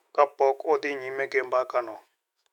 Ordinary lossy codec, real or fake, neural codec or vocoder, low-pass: none; fake; autoencoder, 48 kHz, 128 numbers a frame, DAC-VAE, trained on Japanese speech; 19.8 kHz